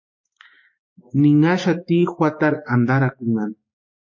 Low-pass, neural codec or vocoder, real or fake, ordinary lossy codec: 7.2 kHz; codec, 16 kHz, 6 kbps, DAC; fake; MP3, 32 kbps